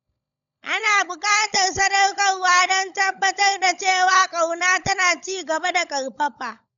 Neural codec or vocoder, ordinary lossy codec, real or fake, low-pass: codec, 16 kHz, 16 kbps, FunCodec, trained on LibriTTS, 50 frames a second; none; fake; 7.2 kHz